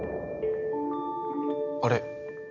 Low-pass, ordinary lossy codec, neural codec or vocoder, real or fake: 7.2 kHz; none; none; real